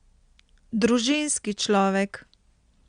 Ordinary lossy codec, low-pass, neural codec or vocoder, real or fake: Opus, 64 kbps; 9.9 kHz; none; real